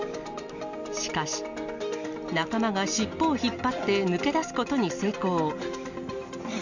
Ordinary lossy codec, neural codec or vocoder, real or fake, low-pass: none; none; real; 7.2 kHz